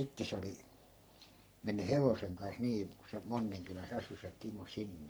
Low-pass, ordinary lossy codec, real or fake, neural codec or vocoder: none; none; fake; codec, 44.1 kHz, 3.4 kbps, Pupu-Codec